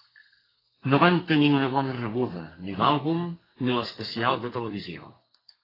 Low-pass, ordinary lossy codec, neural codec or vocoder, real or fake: 5.4 kHz; AAC, 24 kbps; codec, 32 kHz, 1.9 kbps, SNAC; fake